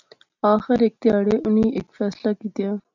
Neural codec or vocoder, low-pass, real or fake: none; 7.2 kHz; real